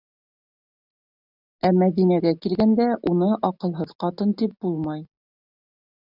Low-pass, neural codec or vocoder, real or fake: 5.4 kHz; none; real